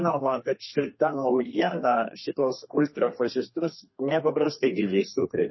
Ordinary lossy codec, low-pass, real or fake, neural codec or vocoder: MP3, 24 kbps; 7.2 kHz; fake; codec, 32 kHz, 1.9 kbps, SNAC